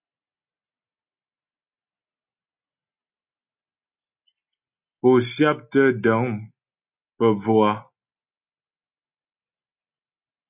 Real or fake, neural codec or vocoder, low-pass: real; none; 3.6 kHz